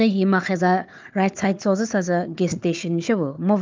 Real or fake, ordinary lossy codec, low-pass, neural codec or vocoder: fake; Opus, 24 kbps; 7.2 kHz; codec, 16 kHz, 4 kbps, FunCodec, trained on Chinese and English, 50 frames a second